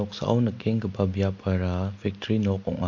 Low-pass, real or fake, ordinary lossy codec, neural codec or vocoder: 7.2 kHz; real; MP3, 48 kbps; none